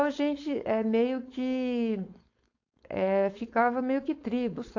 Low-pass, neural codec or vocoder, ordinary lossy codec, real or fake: 7.2 kHz; codec, 16 kHz, 4.8 kbps, FACodec; AAC, 48 kbps; fake